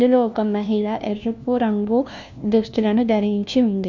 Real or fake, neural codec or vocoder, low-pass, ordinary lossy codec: fake; codec, 16 kHz, 1 kbps, FunCodec, trained on LibriTTS, 50 frames a second; 7.2 kHz; none